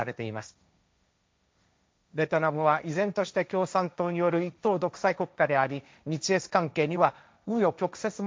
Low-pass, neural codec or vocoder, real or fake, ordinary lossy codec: none; codec, 16 kHz, 1.1 kbps, Voila-Tokenizer; fake; none